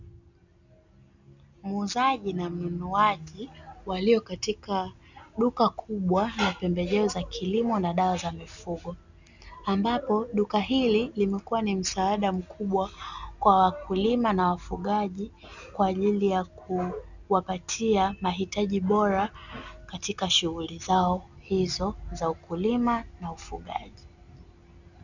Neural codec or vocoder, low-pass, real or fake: none; 7.2 kHz; real